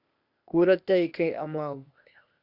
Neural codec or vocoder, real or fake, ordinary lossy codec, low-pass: codec, 16 kHz, 0.8 kbps, ZipCodec; fake; Opus, 64 kbps; 5.4 kHz